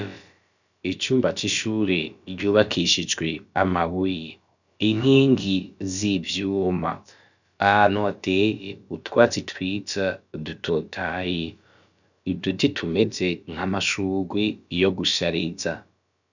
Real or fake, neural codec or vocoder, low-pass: fake; codec, 16 kHz, about 1 kbps, DyCAST, with the encoder's durations; 7.2 kHz